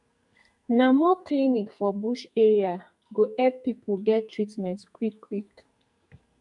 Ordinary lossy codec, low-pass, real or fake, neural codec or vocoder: none; 10.8 kHz; fake; codec, 44.1 kHz, 2.6 kbps, SNAC